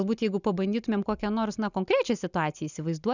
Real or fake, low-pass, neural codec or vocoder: real; 7.2 kHz; none